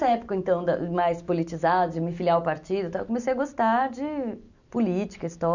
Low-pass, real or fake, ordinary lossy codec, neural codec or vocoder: 7.2 kHz; real; none; none